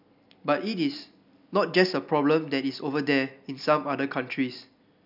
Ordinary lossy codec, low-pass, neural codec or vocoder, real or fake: none; 5.4 kHz; none; real